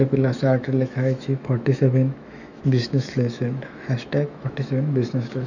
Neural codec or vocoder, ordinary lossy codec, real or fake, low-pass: autoencoder, 48 kHz, 128 numbers a frame, DAC-VAE, trained on Japanese speech; none; fake; 7.2 kHz